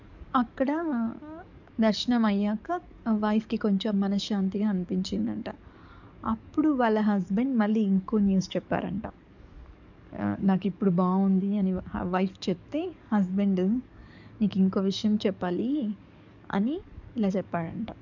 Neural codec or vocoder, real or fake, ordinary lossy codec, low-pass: codec, 44.1 kHz, 7.8 kbps, DAC; fake; none; 7.2 kHz